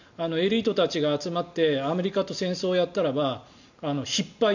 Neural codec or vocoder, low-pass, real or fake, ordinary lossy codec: none; 7.2 kHz; real; none